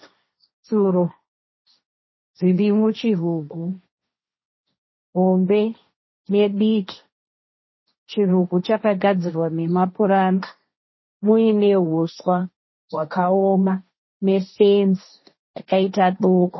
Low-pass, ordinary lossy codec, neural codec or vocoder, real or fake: 7.2 kHz; MP3, 24 kbps; codec, 16 kHz, 1.1 kbps, Voila-Tokenizer; fake